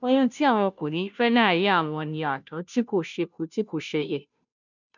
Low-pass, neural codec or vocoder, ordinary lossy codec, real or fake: 7.2 kHz; codec, 16 kHz, 0.5 kbps, FunCodec, trained on Chinese and English, 25 frames a second; none; fake